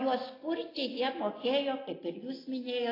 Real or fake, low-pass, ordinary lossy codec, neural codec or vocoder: real; 5.4 kHz; AAC, 24 kbps; none